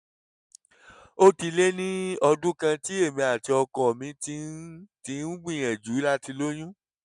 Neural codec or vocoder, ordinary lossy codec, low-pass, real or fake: none; none; 10.8 kHz; real